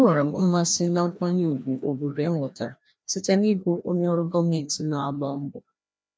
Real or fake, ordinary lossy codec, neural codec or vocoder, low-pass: fake; none; codec, 16 kHz, 1 kbps, FreqCodec, larger model; none